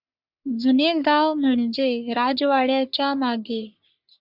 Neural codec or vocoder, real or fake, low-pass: codec, 44.1 kHz, 3.4 kbps, Pupu-Codec; fake; 5.4 kHz